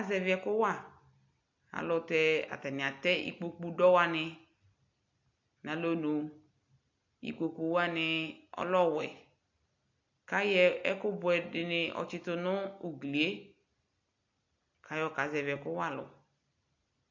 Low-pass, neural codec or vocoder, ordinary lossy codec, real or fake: 7.2 kHz; none; AAC, 48 kbps; real